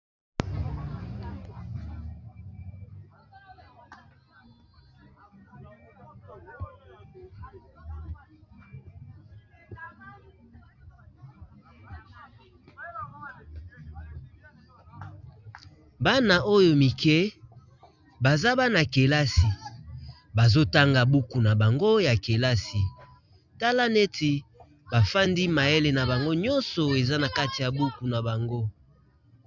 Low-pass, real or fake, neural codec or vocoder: 7.2 kHz; real; none